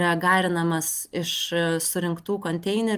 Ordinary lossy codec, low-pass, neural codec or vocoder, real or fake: Opus, 32 kbps; 14.4 kHz; none; real